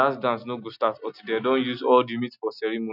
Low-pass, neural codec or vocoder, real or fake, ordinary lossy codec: 5.4 kHz; none; real; none